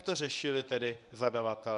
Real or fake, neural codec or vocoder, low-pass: fake; codec, 24 kHz, 0.9 kbps, WavTokenizer, medium speech release version 1; 10.8 kHz